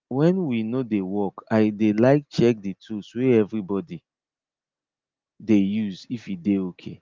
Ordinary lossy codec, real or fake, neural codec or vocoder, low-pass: Opus, 32 kbps; real; none; 7.2 kHz